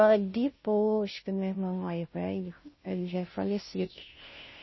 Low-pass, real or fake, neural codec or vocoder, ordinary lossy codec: 7.2 kHz; fake; codec, 16 kHz, 0.5 kbps, FunCodec, trained on Chinese and English, 25 frames a second; MP3, 24 kbps